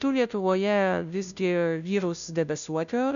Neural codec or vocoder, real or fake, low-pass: codec, 16 kHz, 0.5 kbps, FunCodec, trained on Chinese and English, 25 frames a second; fake; 7.2 kHz